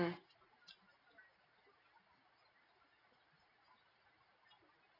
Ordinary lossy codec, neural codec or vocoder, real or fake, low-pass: AAC, 24 kbps; none; real; 5.4 kHz